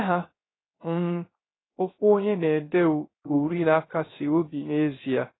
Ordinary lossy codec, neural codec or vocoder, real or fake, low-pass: AAC, 16 kbps; codec, 16 kHz, 0.3 kbps, FocalCodec; fake; 7.2 kHz